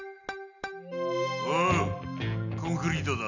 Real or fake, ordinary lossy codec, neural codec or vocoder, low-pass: real; none; none; 7.2 kHz